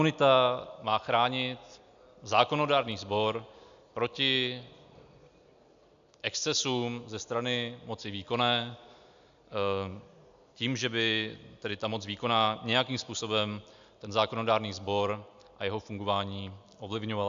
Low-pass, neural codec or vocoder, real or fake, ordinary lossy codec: 7.2 kHz; none; real; AAC, 96 kbps